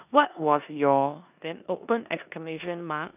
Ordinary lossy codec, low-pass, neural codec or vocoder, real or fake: none; 3.6 kHz; codec, 16 kHz in and 24 kHz out, 0.9 kbps, LongCat-Audio-Codec, four codebook decoder; fake